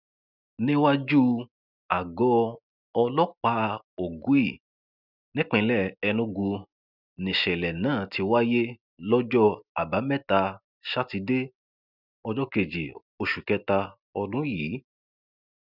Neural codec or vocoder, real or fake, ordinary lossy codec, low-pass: none; real; none; 5.4 kHz